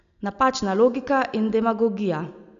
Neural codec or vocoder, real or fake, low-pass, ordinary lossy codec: none; real; 7.2 kHz; MP3, 96 kbps